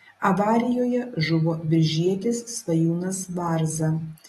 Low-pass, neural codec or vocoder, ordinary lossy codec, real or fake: 19.8 kHz; none; AAC, 32 kbps; real